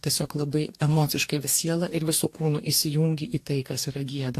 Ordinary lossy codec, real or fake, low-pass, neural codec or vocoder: AAC, 64 kbps; fake; 14.4 kHz; codec, 44.1 kHz, 2.6 kbps, DAC